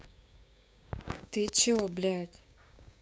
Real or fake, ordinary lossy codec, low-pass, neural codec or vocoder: fake; none; none; codec, 16 kHz, 6 kbps, DAC